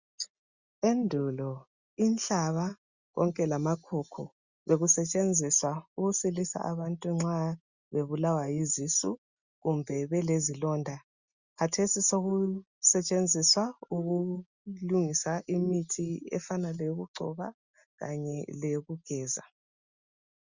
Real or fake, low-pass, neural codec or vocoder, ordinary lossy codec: real; 7.2 kHz; none; Opus, 64 kbps